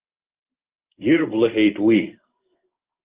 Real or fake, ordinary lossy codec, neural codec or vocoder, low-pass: fake; Opus, 32 kbps; codec, 16 kHz in and 24 kHz out, 1 kbps, XY-Tokenizer; 3.6 kHz